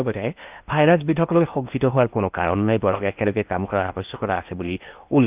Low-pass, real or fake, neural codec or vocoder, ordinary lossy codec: 3.6 kHz; fake; codec, 16 kHz in and 24 kHz out, 0.8 kbps, FocalCodec, streaming, 65536 codes; Opus, 24 kbps